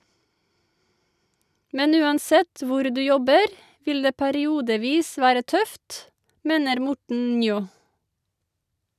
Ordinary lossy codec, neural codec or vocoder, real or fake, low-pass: none; none; real; 14.4 kHz